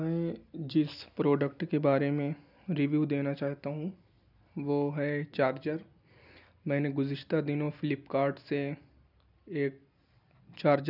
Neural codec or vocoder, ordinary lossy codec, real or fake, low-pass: none; none; real; 5.4 kHz